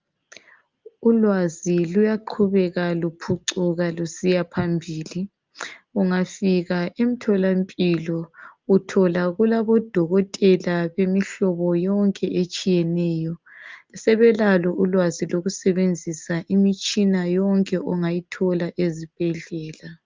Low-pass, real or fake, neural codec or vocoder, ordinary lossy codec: 7.2 kHz; real; none; Opus, 24 kbps